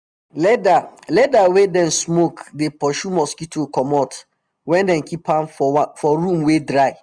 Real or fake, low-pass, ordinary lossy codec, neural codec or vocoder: real; 9.9 kHz; none; none